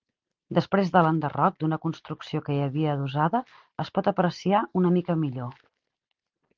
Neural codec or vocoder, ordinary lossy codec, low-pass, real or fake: none; Opus, 32 kbps; 7.2 kHz; real